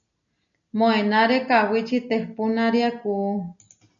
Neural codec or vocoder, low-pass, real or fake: none; 7.2 kHz; real